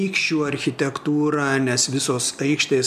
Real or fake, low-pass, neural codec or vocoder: real; 14.4 kHz; none